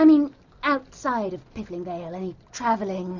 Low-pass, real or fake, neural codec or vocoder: 7.2 kHz; real; none